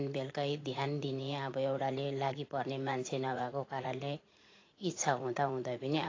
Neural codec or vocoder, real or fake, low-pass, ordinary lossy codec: vocoder, 44.1 kHz, 80 mel bands, Vocos; fake; 7.2 kHz; AAC, 32 kbps